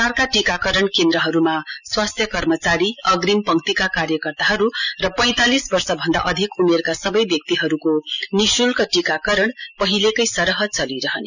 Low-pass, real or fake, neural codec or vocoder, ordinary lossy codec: 7.2 kHz; real; none; none